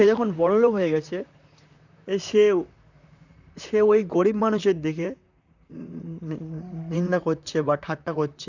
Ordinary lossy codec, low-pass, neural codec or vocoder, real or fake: none; 7.2 kHz; vocoder, 44.1 kHz, 128 mel bands, Pupu-Vocoder; fake